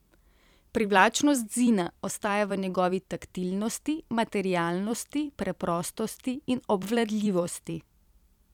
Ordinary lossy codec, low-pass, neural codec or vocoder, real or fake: none; 19.8 kHz; vocoder, 44.1 kHz, 128 mel bands every 256 samples, BigVGAN v2; fake